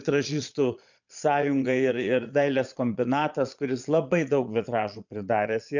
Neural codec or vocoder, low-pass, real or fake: vocoder, 22.05 kHz, 80 mel bands, Vocos; 7.2 kHz; fake